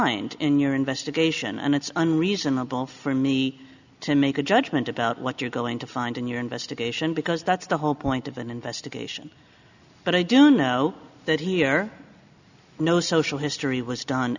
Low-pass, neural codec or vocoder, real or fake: 7.2 kHz; none; real